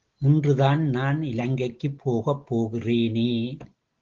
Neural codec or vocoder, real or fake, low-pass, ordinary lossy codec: none; real; 7.2 kHz; Opus, 16 kbps